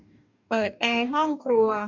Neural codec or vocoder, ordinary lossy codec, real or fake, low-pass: codec, 44.1 kHz, 2.6 kbps, DAC; none; fake; 7.2 kHz